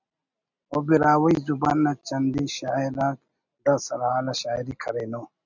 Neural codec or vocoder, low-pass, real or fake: none; 7.2 kHz; real